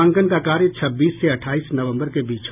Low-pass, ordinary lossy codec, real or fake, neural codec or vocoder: 3.6 kHz; none; real; none